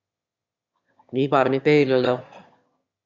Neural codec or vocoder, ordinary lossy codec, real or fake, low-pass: autoencoder, 22.05 kHz, a latent of 192 numbers a frame, VITS, trained on one speaker; Opus, 64 kbps; fake; 7.2 kHz